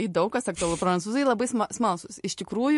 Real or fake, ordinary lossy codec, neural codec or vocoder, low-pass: real; MP3, 48 kbps; none; 14.4 kHz